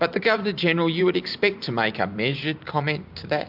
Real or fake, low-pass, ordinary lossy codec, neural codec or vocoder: fake; 5.4 kHz; MP3, 48 kbps; vocoder, 44.1 kHz, 128 mel bands every 512 samples, BigVGAN v2